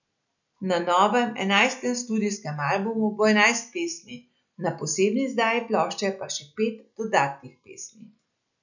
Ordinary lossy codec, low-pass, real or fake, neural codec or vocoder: none; 7.2 kHz; real; none